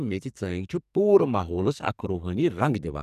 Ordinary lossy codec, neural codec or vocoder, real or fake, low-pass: none; codec, 44.1 kHz, 2.6 kbps, SNAC; fake; 14.4 kHz